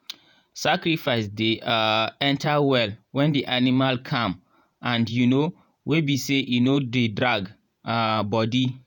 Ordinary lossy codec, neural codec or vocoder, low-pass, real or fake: none; vocoder, 48 kHz, 128 mel bands, Vocos; 19.8 kHz; fake